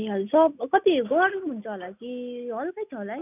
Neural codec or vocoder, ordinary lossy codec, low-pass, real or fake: none; none; 3.6 kHz; real